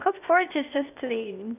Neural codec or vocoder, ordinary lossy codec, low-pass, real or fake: codec, 16 kHz, 0.8 kbps, ZipCodec; none; 3.6 kHz; fake